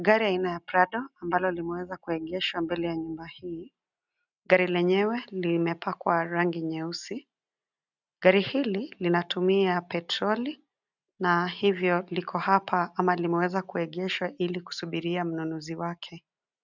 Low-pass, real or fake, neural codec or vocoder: 7.2 kHz; real; none